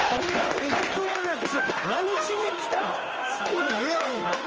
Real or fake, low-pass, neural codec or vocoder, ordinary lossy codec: fake; 7.2 kHz; codec, 16 kHz in and 24 kHz out, 1 kbps, XY-Tokenizer; Opus, 24 kbps